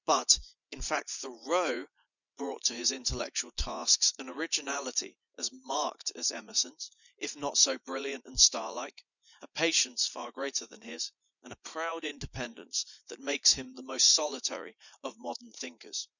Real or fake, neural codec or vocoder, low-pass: fake; vocoder, 44.1 kHz, 80 mel bands, Vocos; 7.2 kHz